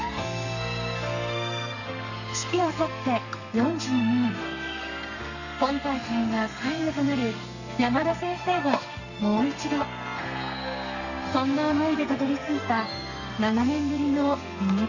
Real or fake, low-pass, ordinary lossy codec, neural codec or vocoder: fake; 7.2 kHz; none; codec, 32 kHz, 1.9 kbps, SNAC